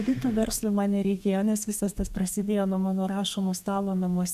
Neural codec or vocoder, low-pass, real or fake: codec, 32 kHz, 1.9 kbps, SNAC; 14.4 kHz; fake